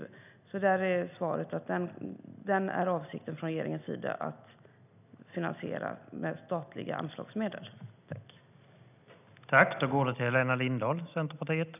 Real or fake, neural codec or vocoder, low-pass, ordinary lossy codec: real; none; 3.6 kHz; none